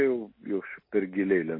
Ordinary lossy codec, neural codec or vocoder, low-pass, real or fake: MP3, 24 kbps; none; 5.4 kHz; real